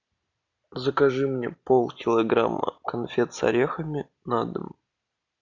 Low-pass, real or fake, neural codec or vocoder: 7.2 kHz; real; none